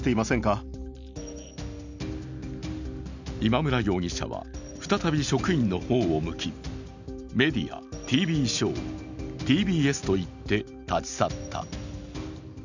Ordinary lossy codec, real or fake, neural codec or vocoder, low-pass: none; real; none; 7.2 kHz